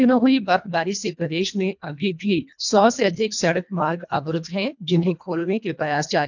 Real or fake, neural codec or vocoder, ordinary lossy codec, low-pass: fake; codec, 24 kHz, 1.5 kbps, HILCodec; none; 7.2 kHz